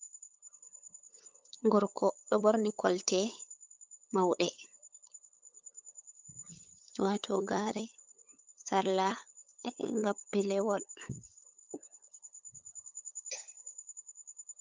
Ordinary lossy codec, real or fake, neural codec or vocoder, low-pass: Opus, 32 kbps; fake; codec, 16 kHz, 8 kbps, FunCodec, trained on LibriTTS, 25 frames a second; 7.2 kHz